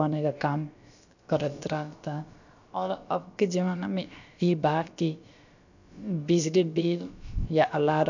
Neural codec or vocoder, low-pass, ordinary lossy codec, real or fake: codec, 16 kHz, about 1 kbps, DyCAST, with the encoder's durations; 7.2 kHz; none; fake